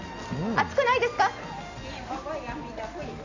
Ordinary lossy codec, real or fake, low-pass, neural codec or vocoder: AAC, 48 kbps; real; 7.2 kHz; none